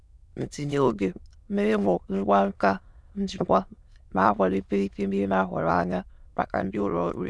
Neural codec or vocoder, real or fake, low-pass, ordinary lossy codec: autoencoder, 22.05 kHz, a latent of 192 numbers a frame, VITS, trained on many speakers; fake; none; none